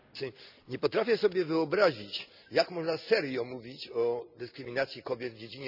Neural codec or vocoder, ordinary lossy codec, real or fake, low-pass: none; none; real; 5.4 kHz